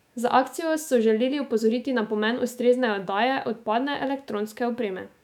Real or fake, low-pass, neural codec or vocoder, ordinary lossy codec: fake; 19.8 kHz; autoencoder, 48 kHz, 128 numbers a frame, DAC-VAE, trained on Japanese speech; none